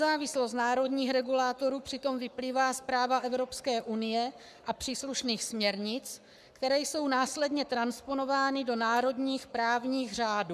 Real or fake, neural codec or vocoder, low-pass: fake; codec, 44.1 kHz, 7.8 kbps, Pupu-Codec; 14.4 kHz